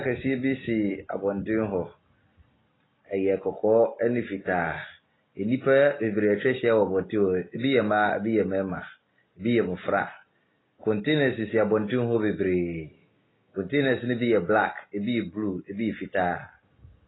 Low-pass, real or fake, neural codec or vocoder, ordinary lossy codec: 7.2 kHz; real; none; AAC, 16 kbps